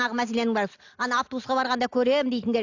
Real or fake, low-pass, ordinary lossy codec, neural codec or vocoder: real; 7.2 kHz; AAC, 48 kbps; none